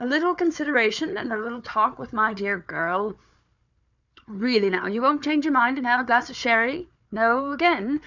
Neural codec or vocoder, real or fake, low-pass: codec, 16 kHz, 4 kbps, FunCodec, trained on Chinese and English, 50 frames a second; fake; 7.2 kHz